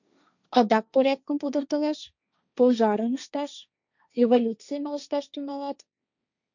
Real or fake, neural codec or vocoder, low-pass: fake; codec, 16 kHz, 1.1 kbps, Voila-Tokenizer; 7.2 kHz